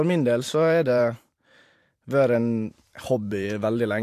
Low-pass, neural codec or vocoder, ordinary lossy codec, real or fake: 14.4 kHz; vocoder, 44.1 kHz, 128 mel bands every 512 samples, BigVGAN v2; AAC, 64 kbps; fake